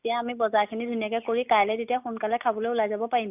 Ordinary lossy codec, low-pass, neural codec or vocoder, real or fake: none; 3.6 kHz; none; real